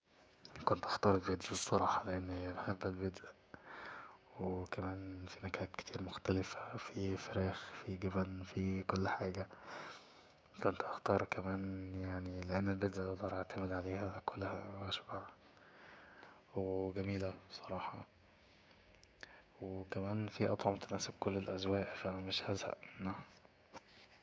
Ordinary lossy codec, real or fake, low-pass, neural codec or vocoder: none; fake; none; codec, 16 kHz, 6 kbps, DAC